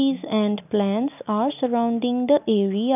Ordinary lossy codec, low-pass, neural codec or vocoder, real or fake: none; 3.6 kHz; none; real